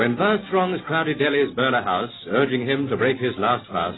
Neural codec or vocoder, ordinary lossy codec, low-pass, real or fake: none; AAC, 16 kbps; 7.2 kHz; real